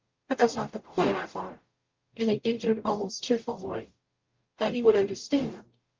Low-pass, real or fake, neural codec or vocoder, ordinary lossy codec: 7.2 kHz; fake; codec, 44.1 kHz, 0.9 kbps, DAC; Opus, 24 kbps